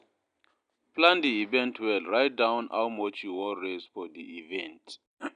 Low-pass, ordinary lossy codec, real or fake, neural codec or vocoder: 9.9 kHz; none; real; none